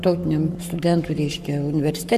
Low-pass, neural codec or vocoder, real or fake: 14.4 kHz; codec, 44.1 kHz, 7.8 kbps, Pupu-Codec; fake